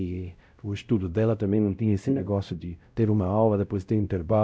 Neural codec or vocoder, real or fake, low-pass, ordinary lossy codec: codec, 16 kHz, 0.5 kbps, X-Codec, WavLM features, trained on Multilingual LibriSpeech; fake; none; none